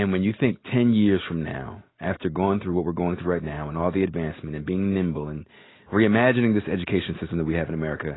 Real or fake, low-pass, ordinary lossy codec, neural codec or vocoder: real; 7.2 kHz; AAC, 16 kbps; none